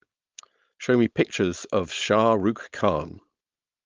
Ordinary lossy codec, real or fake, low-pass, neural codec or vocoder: Opus, 32 kbps; fake; 7.2 kHz; codec, 16 kHz, 4.8 kbps, FACodec